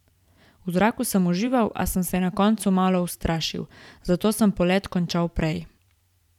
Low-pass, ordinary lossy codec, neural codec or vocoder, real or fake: 19.8 kHz; none; vocoder, 44.1 kHz, 128 mel bands every 512 samples, BigVGAN v2; fake